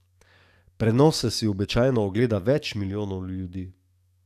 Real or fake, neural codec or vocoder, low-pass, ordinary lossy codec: fake; codec, 44.1 kHz, 7.8 kbps, DAC; 14.4 kHz; none